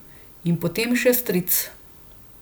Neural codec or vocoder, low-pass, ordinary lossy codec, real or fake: none; none; none; real